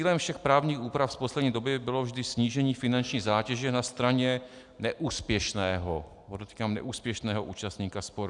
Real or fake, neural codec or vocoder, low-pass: real; none; 10.8 kHz